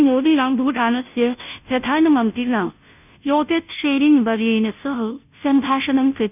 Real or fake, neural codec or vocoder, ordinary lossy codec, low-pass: fake; codec, 16 kHz, 0.5 kbps, FunCodec, trained on Chinese and English, 25 frames a second; none; 3.6 kHz